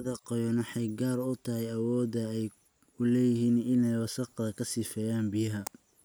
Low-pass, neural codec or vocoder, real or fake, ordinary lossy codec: none; none; real; none